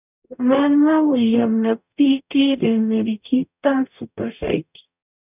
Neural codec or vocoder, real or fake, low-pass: codec, 44.1 kHz, 0.9 kbps, DAC; fake; 3.6 kHz